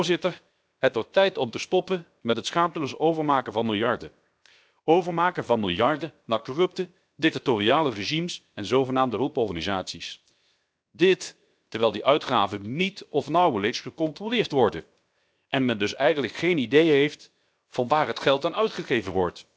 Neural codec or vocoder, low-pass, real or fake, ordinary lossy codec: codec, 16 kHz, 0.7 kbps, FocalCodec; none; fake; none